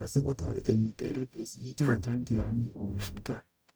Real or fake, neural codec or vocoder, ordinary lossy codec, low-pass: fake; codec, 44.1 kHz, 0.9 kbps, DAC; none; none